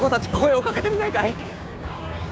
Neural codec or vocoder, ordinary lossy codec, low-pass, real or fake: codec, 16 kHz, 6 kbps, DAC; none; none; fake